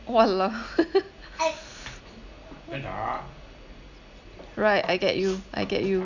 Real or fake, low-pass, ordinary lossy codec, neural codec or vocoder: real; 7.2 kHz; none; none